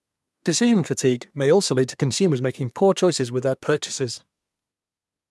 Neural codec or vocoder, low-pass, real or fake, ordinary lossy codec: codec, 24 kHz, 1 kbps, SNAC; none; fake; none